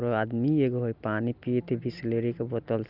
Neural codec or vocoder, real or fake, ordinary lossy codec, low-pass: none; real; Opus, 32 kbps; 5.4 kHz